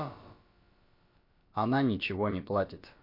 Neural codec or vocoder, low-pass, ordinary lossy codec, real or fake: codec, 16 kHz, about 1 kbps, DyCAST, with the encoder's durations; 5.4 kHz; MP3, 32 kbps; fake